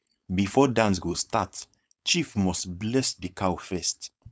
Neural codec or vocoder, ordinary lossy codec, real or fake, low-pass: codec, 16 kHz, 4.8 kbps, FACodec; none; fake; none